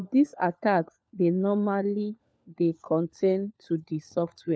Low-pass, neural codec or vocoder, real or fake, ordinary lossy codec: none; codec, 16 kHz, 4 kbps, FunCodec, trained on LibriTTS, 50 frames a second; fake; none